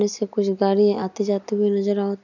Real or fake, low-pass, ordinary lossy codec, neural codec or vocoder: real; 7.2 kHz; none; none